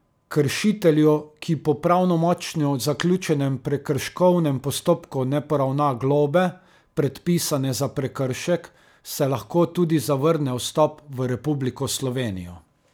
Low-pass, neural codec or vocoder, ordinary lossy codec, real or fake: none; none; none; real